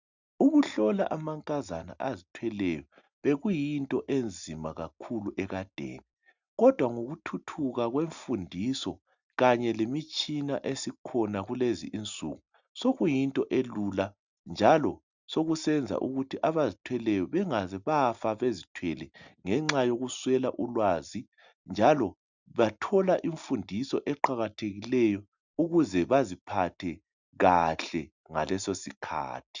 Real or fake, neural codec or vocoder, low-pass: real; none; 7.2 kHz